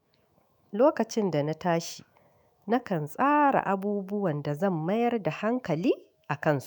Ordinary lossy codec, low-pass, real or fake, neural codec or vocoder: none; none; fake; autoencoder, 48 kHz, 128 numbers a frame, DAC-VAE, trained on Japanese speech